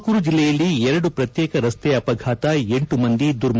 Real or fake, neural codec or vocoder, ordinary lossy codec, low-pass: real; none; none; none